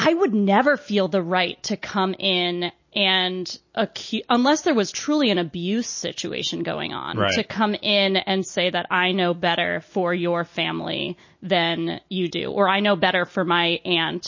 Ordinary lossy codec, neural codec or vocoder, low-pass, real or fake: MP3, 32 kbps; none; 7.2 kHz; real